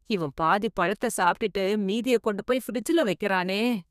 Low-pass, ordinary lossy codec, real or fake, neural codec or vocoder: 14.4 kHz; none; fake; codec, 32 kHz, 1.9 kbps, SNAC